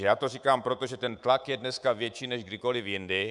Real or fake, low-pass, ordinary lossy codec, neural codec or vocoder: fake; 10.8 kHz; Opus, 64 kbps; codec, 24 kHz, 3.1 kbps, DualCodec